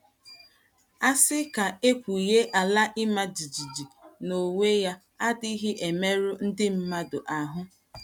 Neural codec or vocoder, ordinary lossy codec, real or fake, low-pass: none; none; real; 19.8 kHz